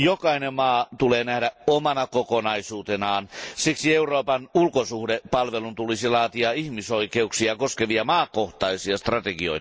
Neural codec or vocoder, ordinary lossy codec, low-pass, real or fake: none; none; none; real